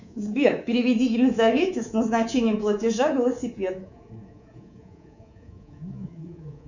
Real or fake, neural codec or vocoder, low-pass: fake; codec, 24 kHz, 3.1 kbps, DualCodec; 7.2 kHz